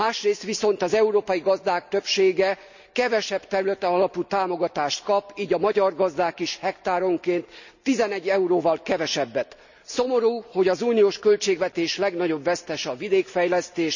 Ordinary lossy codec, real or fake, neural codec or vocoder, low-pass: none; real; none; 7.2 kHz